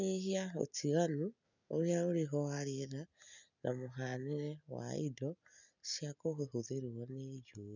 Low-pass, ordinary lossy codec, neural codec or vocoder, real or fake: 7.2 kHz; none; none; real